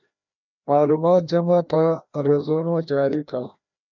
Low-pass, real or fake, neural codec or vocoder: 7.2 kHz; fake; codec, 16 kHz, 1 kbps, FreqCodec, larger model